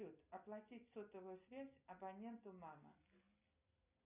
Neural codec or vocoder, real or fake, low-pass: none; real; 3.6 kHz